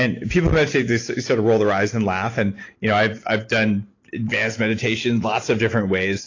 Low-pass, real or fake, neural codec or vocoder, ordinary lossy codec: 7.2 kHz; real; none; AAC, 32 kbps